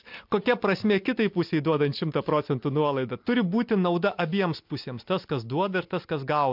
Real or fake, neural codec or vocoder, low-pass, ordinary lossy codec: real; none; 5.4 kHz; MP3, 48 kbps